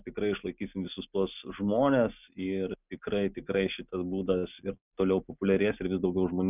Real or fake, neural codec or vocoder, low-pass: real; none; 3.6 kHz